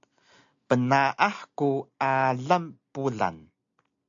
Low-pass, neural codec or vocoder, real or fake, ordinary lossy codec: 7.2 kHz; none; real; AAC, 64 kbps